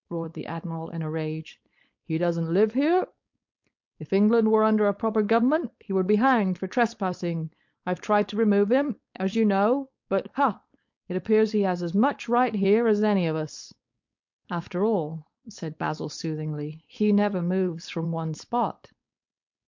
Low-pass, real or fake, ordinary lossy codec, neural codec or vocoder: 7.2 kHz; fake; MP3, 48 kbps; codec, 16 kHz, 4.8 kbps, FACodec